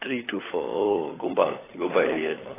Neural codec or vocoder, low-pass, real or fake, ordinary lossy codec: codec, 16 kHz, 16 kbps, FunCodec, trained on LibriTTS, 50 frames a second; 3.6 kHz; fake; AAC, 16 kbps